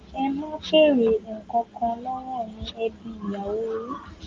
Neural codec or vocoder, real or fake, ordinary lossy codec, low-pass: none; real; Opus, 32 kbps; 7.2 kHz